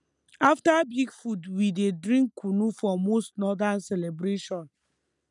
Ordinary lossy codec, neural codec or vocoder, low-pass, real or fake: none; none; 10.8 kHz; real